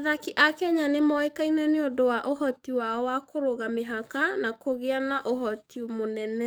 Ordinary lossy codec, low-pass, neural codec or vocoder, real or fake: none; none; codec, 44.1 kHz, 7.8 kbps, DAC; fake